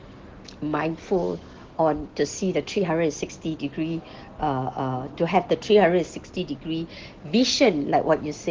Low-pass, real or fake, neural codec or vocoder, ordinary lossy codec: 7.2 kHz; real; none; Opus, 16 kbps